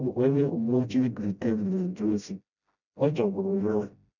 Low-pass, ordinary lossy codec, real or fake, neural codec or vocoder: 7.2 kHz; none; fake; codec, 16 kHz, 0.5 kbps, FreqCodec, smaller model